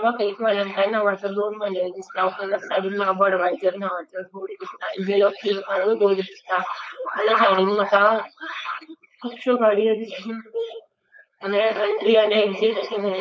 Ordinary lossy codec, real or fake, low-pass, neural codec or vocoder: none; fake; none; codec, 16 kHz, 4.8 kbps, FACodec